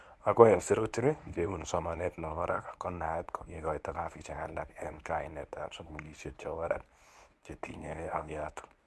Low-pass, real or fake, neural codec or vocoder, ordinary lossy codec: none; fake; codec, 24 kHz, 0.9 kbps, WavTokenizer, medium speech release version 1; none